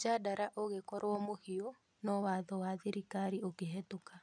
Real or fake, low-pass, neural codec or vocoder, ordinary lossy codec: real; 9.9 kHz; none; none